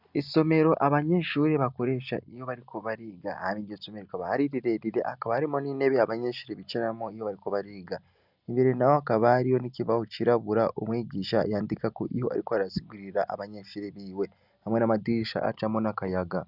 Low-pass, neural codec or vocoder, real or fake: 5.4 kHz; none; real